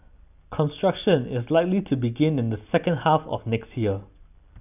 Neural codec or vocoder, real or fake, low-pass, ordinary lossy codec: none; real; 3.6 kHz; none